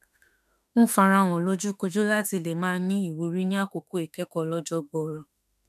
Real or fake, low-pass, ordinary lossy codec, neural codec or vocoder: fake; 14.4 kHz; none; autoencoder, 48 kHz, 32 numbers a frame, DAC-VAE, trained on Japanese speech